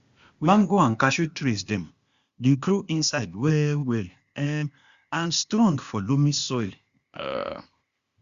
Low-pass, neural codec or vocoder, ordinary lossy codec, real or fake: 7.2 kHz; codec, 16 kHz, 0.8 kbps, ZipCodec; Opus, 64 kbps; fake